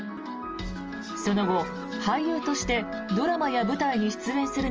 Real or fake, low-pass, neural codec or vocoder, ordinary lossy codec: real; 7.2 kHz; none; Opus, 24 kbps